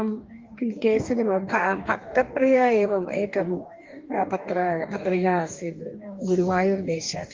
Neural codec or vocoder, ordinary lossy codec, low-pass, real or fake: codec, 44.1 kHz, 2.6 kbps, DAC; Opus, 32 kbps; 7.2 kHz; fake